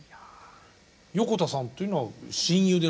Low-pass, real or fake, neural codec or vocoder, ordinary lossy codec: none; real; none; none